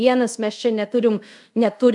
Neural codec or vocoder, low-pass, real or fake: codec, 24 kHz, 0.5 kbps, DualCodec; 10.8 kHz; fake